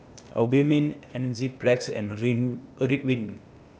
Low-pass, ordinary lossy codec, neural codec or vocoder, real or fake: none; none; codec, 16 kHz, 0.8 kbps, ZipCodec; fake